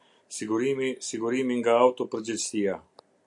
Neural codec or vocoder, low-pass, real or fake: none; 10.8 kHz; real